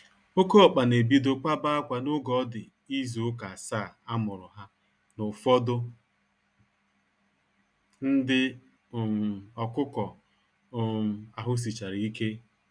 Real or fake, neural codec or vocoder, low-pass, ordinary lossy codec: real; none; 9.9 kHz; none